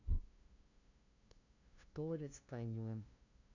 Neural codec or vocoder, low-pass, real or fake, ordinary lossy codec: codec, 16 kHz, 0.5 kbps, FunCodec, trained on LibriTTS, 25 frames a second; 7.2 kHz; fake; none